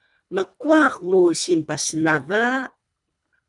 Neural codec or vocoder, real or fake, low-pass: codec, 24 kHz, 1.5 kbps, HILCodec; fake; 10.8 kHz